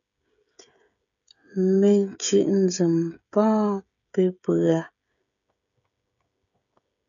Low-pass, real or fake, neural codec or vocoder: 7.2 kHz; fake; codec, 16 kHz, 16 kbps, FreqCodec, smaller model